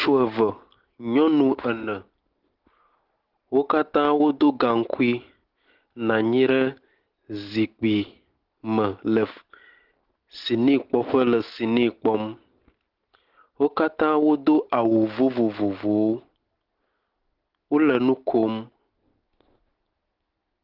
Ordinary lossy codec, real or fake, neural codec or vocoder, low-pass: Opus, 16 kbps; real; none; 5.4 kHz